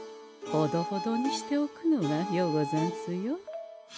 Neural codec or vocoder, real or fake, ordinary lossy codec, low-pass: none; real; none; none